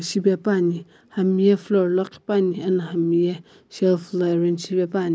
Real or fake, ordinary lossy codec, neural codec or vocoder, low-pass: real; none; none; none